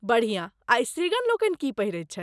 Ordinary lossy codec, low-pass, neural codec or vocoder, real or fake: none; none; none; real